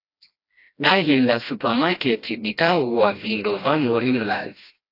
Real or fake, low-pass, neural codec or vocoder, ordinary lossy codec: fake; 5.4 kHz; codec, 16 kHz, 1 kbps, FreqCodec, smaller model; AAC, 32 kbps